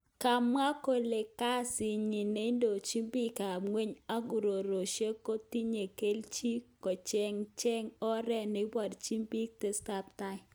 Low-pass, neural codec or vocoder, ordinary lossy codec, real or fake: none; none; none; real